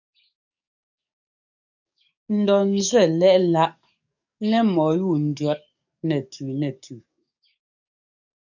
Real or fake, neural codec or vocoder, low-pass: fake; codec, 44.1 kHz, 7.8 kbps, DAC; 7.2 kHz